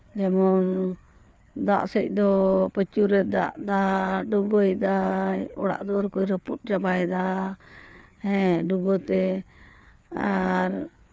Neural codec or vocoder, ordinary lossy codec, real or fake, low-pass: codec, 16 kHz, 4 kbps, FreqCodec, larger model; none; fake; none